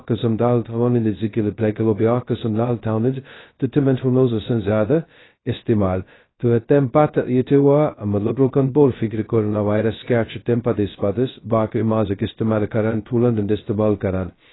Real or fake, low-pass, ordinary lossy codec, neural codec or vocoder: fake; 7.2 kHz; AAC, 16 kbps; codec, 16 kHz, 0.2 kbps, FocalCodec